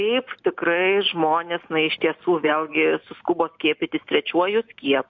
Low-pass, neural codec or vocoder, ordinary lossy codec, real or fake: 7.2 kHz; none; MP3, 48 kbps; real